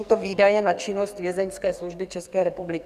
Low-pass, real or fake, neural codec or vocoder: 14.4 kHz; fake; codec, 44.1 kHz, 2.6 kbps, SNAC